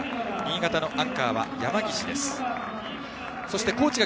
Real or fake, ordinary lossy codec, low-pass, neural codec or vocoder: real; none; none; none